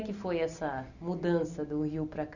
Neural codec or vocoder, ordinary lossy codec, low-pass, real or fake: none; none; 7.2 kHz; real